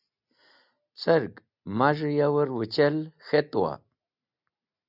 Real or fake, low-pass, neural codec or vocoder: real; 5.4 kHz; none